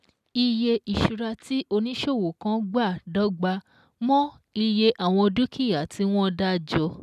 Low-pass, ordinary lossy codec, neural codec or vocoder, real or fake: 14.4 kHz; none; none; real